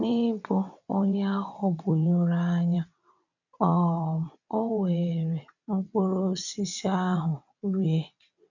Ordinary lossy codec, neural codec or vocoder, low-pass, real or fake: none; vocoder, 22.05 kHz, 80 mel bands, WaveNeXt; 7.2 kHz; fake